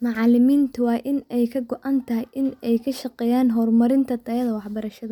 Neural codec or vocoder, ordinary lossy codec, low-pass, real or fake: none; none; 19.8 kHz; real